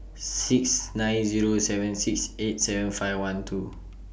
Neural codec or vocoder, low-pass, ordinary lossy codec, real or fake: none; none; none; real